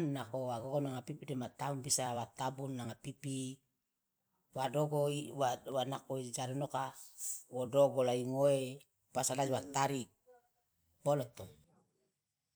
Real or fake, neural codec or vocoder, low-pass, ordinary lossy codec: fake; vocoder, 44.1 kHz, 128 mel bands every 256 samples, BigVGAN v2; none; none